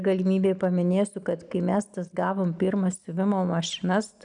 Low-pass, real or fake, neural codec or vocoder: 9.9 kHz; real; none